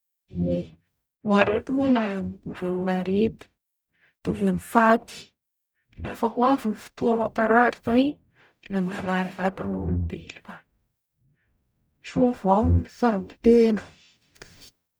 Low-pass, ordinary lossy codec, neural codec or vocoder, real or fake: none; none; codec, 44.1 kHz, 0.9 kbps, DAC; fake